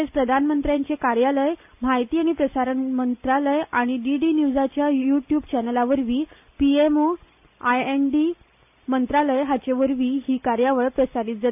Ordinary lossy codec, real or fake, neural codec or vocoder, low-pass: AAC, 32 kbps; real; none; 3.6 kHz